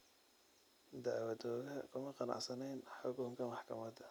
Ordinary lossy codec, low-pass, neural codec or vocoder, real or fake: none; none; none; real